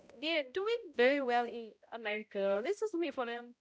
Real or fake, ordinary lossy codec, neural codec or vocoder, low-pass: fake; none; codec, 16 kHz, 0.5 kbps, X-Codec, HuBERT features, trained on balanced general audio; none